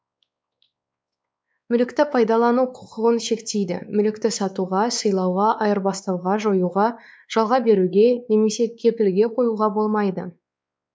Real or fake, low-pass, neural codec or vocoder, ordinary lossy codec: fake; none; codec, 16 kHz, 4 kbps, X-Codec, WavLM features, trained on Multilingual LibriSpeech; none